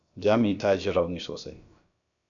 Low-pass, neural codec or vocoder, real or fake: 7.2 kHz; codec, 16 kHz, about 1 kbps, DyCAST, with the encoder's durations; fake